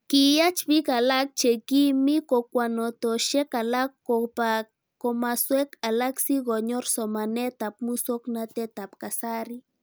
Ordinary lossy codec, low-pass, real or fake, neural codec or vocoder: none; none; real; none